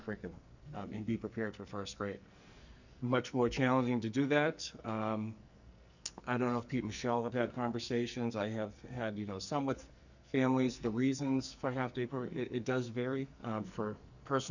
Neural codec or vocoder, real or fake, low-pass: codec, 44.1 kHz, 2.6 kbps, SNAC; fake; 7.2 kHz